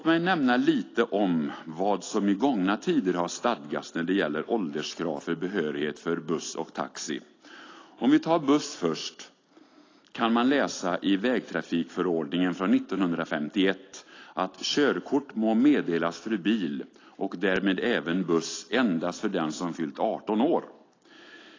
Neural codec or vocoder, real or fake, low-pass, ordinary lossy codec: none; real; 7.2 kHz; AAC, 32 kbps